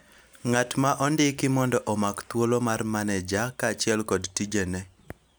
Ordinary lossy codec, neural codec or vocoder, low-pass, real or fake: none; none; none; real